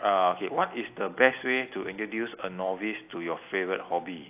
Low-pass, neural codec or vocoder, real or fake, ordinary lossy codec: 3.6 kHz; none; real; AAC, 32 kbps